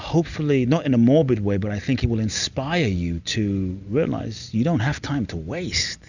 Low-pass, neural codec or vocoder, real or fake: 7.2 kHz; none; real